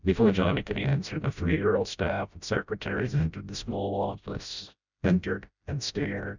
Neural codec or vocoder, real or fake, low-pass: codec, 16 kHz, 0.5 kbps, FreqCodec, smaller model; fake; 7.2 kHz